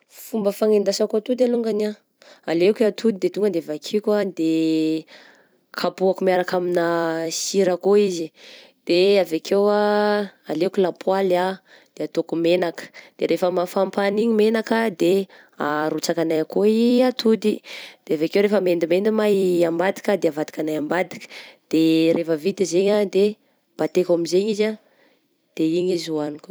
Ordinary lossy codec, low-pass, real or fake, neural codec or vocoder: none; none; fake; vocoder, 44.1 kHz, 128 mel bands every 256 samples, BigVGAN v2